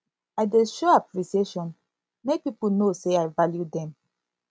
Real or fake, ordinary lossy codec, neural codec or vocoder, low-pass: real; none; none; none